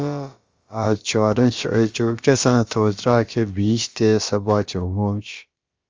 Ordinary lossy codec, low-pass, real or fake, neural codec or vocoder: Opus, 32 kbps; 7.2 kHz; fake; codec, 16 kHz, about 1 kbps, DyCAST, with the encoder's durations